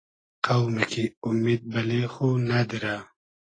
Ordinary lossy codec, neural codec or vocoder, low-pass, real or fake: AAC, 32 kbps; none; 9.9 kHz; real